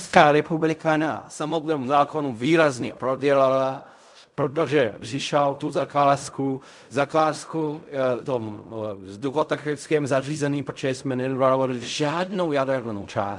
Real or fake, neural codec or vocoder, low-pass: fake; codec, 16 kHz in and 24 kHz out, 0.4 kbps, LongCat-Audio-Codec, fine tuned four codebook decoder; 10.8 kHz